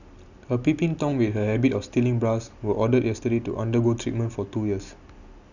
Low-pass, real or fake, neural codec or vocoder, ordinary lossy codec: 7.2 kHz; real; none; none